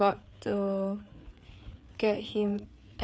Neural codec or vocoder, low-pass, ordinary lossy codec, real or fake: codec, 16 kHz, 4 kbps, FreqCodec, larger model; none; none; fake